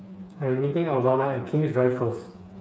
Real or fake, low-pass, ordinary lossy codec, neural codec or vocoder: fake; none; none; codec, 16 kHz, 4 kbps, FreqCodec, smaller model